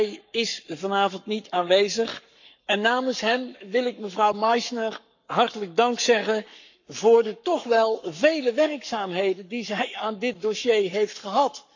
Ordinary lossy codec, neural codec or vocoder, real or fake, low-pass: none; codec, 44.1 kHz, 7.8 kbps, Pupu-Codec; fake; 7.2 kHz